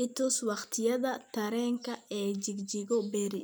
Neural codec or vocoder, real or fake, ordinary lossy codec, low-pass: vocoder, 44.1 kHz, 128 mel bands every 256 samples, BigVGAN v2; fake; none; none